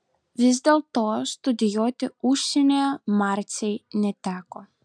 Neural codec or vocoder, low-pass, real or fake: none; 9.9 kHz; real